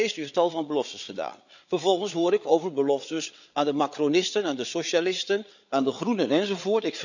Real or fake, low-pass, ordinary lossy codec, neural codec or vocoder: fake; 7.2 kHz; none; codec, 16 kHz, 8 kbps, FreqCodec, larger model